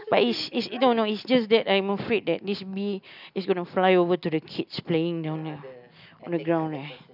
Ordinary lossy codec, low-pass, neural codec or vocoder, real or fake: none; 5.4 kHz; none; real